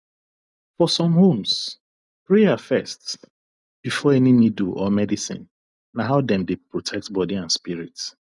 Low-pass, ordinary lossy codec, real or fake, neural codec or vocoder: 10.8 kHz; none; real; none